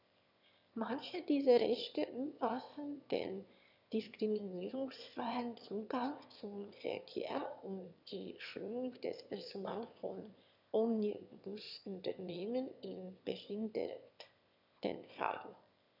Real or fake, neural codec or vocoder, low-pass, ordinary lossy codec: fake; autoencoder, 22.05 kHz, a latent of 192 numbers a frame, VITS, trained on one speaker; 5.4 kHz; none